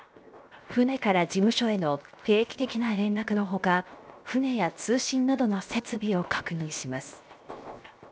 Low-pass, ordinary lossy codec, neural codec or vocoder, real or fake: none; none; codec, 16 kHz, 0.7 kbps, FocalCodec; fake